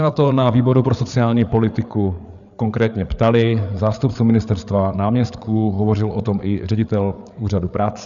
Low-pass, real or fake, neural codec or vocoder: 7.2 kHz; fake; codec, 16 kHz, 8 kbps, FreqCodec, larger model